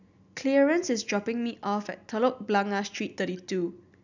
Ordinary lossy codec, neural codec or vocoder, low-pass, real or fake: none; none; 7.2 kHz; real